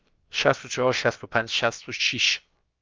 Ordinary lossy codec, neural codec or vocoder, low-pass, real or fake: Opus, 32 kbps; codec, 16 kHz, about 1 kbps, DyCAST, with the encoder's durations; 7.2 kHz; fake